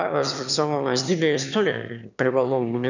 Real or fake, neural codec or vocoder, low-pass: fake; autoencoder, 22.05 kHz, a latent of 192 numbers a frame, VITS, trained on one speaker; 7.2 kHz